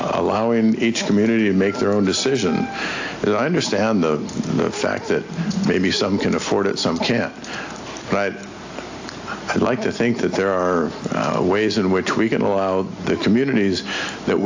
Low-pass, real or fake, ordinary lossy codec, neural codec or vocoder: 7.2 kHz; real; AAC, 48 kbps; none